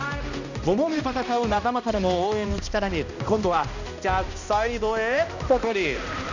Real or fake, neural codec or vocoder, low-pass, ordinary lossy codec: fake; codec, 16 kHz, 1 kbps, X-Codec, HuBERT features, trained on balanced general audio; 7.2 kHz; none